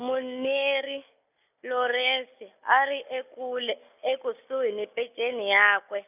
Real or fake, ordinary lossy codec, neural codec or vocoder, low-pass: real; none; none; 3.6 kHz